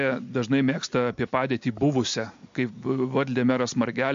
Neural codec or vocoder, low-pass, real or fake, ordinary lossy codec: none; 7.2 kHz; real; MP3, 48 kbps